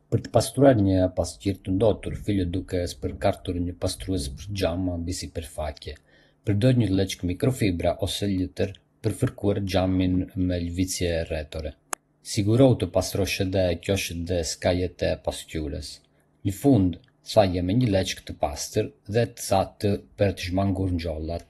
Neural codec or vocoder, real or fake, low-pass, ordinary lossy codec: none; real; 19.8 kHz; AAC, 32 kbps